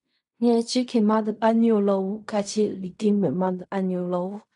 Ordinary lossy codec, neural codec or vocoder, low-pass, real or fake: none; codec, 16 kHz in and 24 kHz out, 0.4 kbps, LongCat-Audio-Codec, fine tuned four codebook decoder; 10.8 kHz; fake